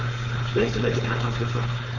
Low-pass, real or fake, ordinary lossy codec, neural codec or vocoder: 7.2 kHz; fake; none; codec, 16 kHz, 4.8 kbps, FACodec